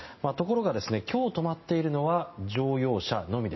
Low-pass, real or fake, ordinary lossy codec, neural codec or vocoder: 7.2 kHz; real; MP3, 24 kbps; none